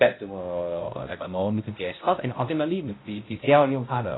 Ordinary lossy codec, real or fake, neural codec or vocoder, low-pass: AAC, 16 kbps; fake; codec, 16 kHz, 0.5 kbps, X-Codec, HuBERT features, trained on balanced general audio; 7.2 kHz